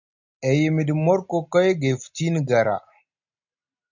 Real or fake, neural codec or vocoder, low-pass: real; none; 7.2 kHz